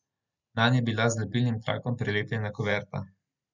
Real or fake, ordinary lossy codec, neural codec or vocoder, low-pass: real; none; none; 7.2 kHz